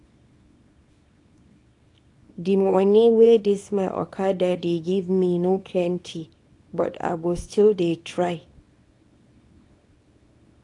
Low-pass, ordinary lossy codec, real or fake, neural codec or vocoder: 10.8 kHz; AAC, 48 kbps; fake; codec, 24 kHz, 0.9 kbps, WavTokenizer, small release